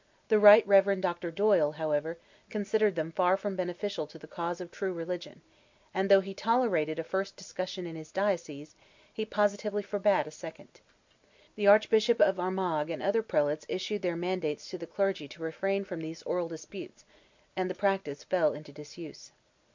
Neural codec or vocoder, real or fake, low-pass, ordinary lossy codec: none; real; 7.2 kHz; MP3, 64 kbps